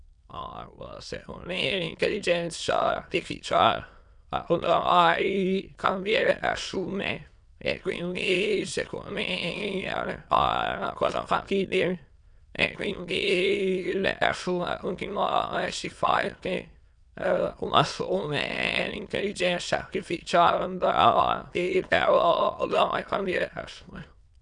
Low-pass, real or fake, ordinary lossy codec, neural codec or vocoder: 9.9 kHz; fake; none; autoencoder, 22.05 kHz, a latent of 192 numbers a frame, VITS, trained on many speakers